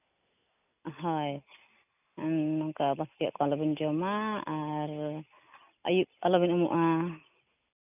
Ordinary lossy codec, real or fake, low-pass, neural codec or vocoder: none; real; 3.6 kHz; none